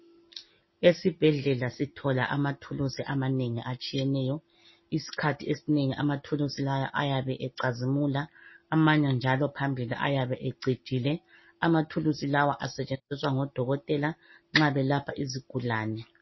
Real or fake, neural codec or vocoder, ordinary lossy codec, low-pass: real; none; MP3, 24 kbps; 7.2 kHz